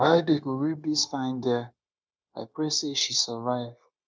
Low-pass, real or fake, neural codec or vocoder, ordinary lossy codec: none; fake; codec, 16 kHz, 0.9 kbps, LongCat-Audio-Codec; none